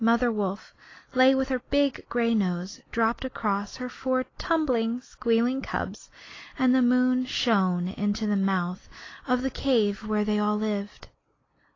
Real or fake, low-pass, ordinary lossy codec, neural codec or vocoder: real; 7.2 kHz; AAC, 32 kbps; none